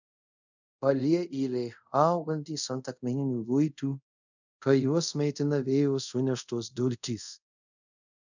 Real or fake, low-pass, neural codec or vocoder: fake; 7.2 kHz; codec, 24 kHz, 0.5 kbps, DualCodec